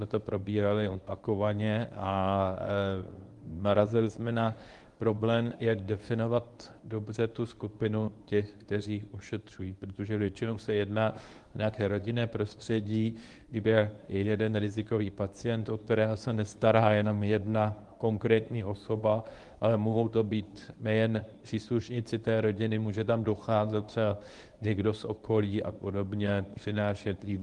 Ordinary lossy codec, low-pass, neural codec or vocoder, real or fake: Opus, 24 kbps; 10.8 kHz; codec, 24 kHz, 0.9 kbps, WavTokenizer, medium speech release version 1; fake